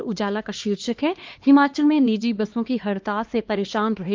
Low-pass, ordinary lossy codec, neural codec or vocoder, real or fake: 7.2 kHz; Opus, 24 kbps; codec, 16 kHz, 2 kbps, X-Codec, HuBERT features, trained on LibriSpeech; fake